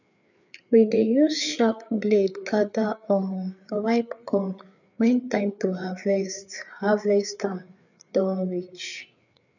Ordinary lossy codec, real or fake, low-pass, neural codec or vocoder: none; fake; 7.2 kHz; codec, 16 kHz, 4 kbps, FreqCodec, larger model